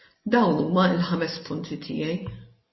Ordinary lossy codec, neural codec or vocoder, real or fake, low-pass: MP3, 24 kbps; none; real; 7.2 kHz